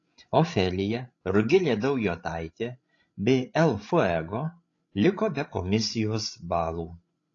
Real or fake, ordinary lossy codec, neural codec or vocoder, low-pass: fake; AAC, 32 kbps; codec, 16 kHz, 16 kbps, FreqCodec, larger model; 7.2 kHz